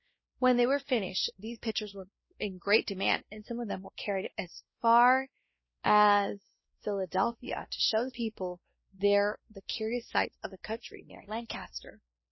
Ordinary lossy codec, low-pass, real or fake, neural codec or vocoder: MP3, 24 kbps; 7.2 kHz; fake; codec, 16 kHz, 1 kbps, X-Codec, WavLM features, trained on Multilingual LibriSpeech